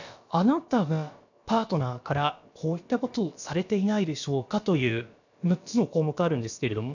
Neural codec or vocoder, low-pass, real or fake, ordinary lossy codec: codec, 16 kHz, about 1 kbps, DyCAST, with the encoder's durations; 7.2 kHz; fake; none